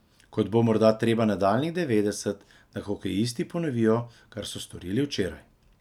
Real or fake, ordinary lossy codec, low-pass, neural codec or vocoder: real; none; 19.8 kHz; none